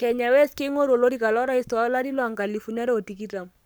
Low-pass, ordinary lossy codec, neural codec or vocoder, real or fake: none; none; codec, 44.1 kHz, 7.8 kbps, Pupu-Codec; fake